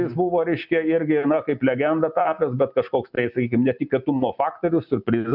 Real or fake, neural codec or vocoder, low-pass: real; none; 5.4 kHz